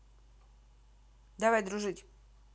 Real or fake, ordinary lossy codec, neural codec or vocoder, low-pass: real; none; none; none